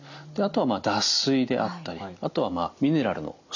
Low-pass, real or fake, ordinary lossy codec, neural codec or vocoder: 7.2 kHz; real; none; none